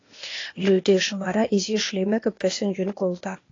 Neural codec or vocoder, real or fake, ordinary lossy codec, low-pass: codec, 16 kHz, 0.8 kbps, ZipCodec; fake; AAC, 32 kbps; 7.2 kHz